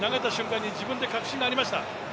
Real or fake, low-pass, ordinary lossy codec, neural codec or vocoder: real; none; none; none